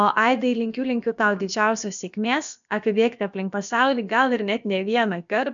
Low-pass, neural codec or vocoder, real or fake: 7.2 kHz; codec, 16 kHz, about 1 kbps, DyCAST, with the encoder's durations; fake